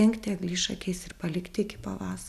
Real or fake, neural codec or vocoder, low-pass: real; none; 14.4 kHz